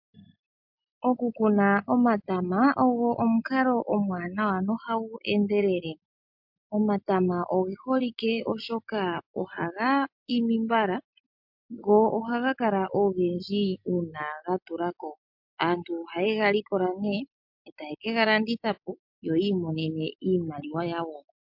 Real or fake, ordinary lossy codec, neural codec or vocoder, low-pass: real; MP3, 48 kbps; none; 5.4 kHz